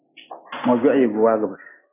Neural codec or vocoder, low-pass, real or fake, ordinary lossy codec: none; 3.6 kHz; real; AAC, 16 kbps